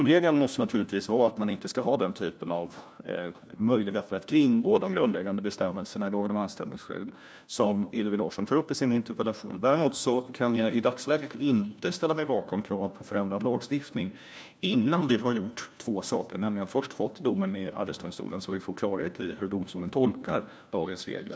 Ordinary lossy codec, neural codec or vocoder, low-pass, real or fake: none; codec, 16 kHz, 1 kbps, FunCodec, trained on LibriTTS, 50 frames a second; none; fake